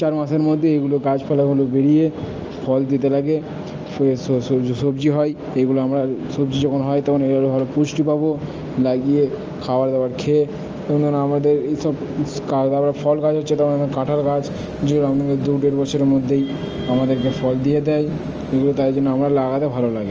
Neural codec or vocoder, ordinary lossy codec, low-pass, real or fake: none; Opus, 24 kbps; 7.2 kHz; real